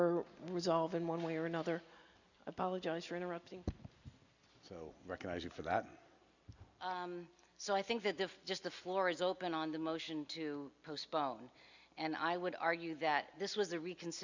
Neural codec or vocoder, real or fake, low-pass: none; real; 7.2 kHz